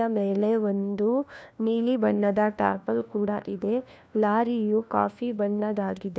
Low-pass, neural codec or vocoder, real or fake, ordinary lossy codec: none; codec, 16 kHz, 1 kbps, FunCodec, trained on LibriTTS, 50 frames a second; fake; none